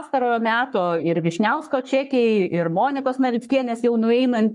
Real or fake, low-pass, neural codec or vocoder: fake; 10.8 kHz; codec, 44.1 kHz, 3.4 kbps, Pupu-Codec